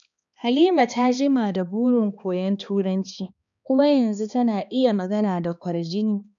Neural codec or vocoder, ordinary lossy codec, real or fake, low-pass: codec, 16 kHz, 2 kbps, X-Codec, HuBERT features, trained on balanced general audio; none; fake; 7.2 kHz